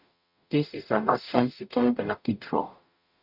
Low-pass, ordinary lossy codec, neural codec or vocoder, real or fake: 5.4 kHz; none; codec, 44.1 kHz, 0.9 kbps, DAC; fake